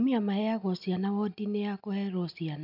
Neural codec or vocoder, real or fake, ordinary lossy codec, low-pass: none; real; none; 5.4 kHz